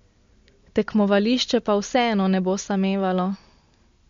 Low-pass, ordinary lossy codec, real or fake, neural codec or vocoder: 7.2 kHz; MP3, 48 kbps; real; none